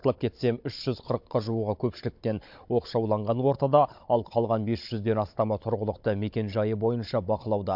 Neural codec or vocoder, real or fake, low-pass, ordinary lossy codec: codec, 16 kHz, 16 kbps, FunCodec, trained on Chinese and English, 50 frames a second; fake; 5.4 kHz; MP3, 48 kbps